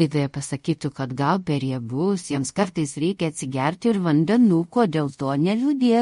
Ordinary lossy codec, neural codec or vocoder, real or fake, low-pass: MP3, 48 kbps; codec, 24 kHz, 0.5 kbps, DualCodec; fake; 10.8 kHz